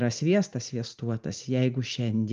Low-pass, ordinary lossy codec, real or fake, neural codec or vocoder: 7.2 kHz; Opus, 32 kbps; real; none